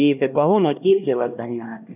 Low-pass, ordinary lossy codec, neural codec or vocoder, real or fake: 3.6 kHz; none; codec, 16 kHz, 1 kbps, X-Codec, HuBERT features, trained on LibriSpeech; fake